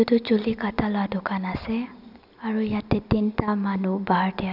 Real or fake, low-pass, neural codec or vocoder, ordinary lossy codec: real; 5.4 kHz; none; none